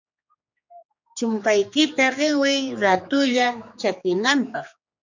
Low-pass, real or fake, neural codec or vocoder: 7.2 kHz; fake; codec, 16 kHz, 2 kbps, X-Codec, HuBERT features, trained on general audio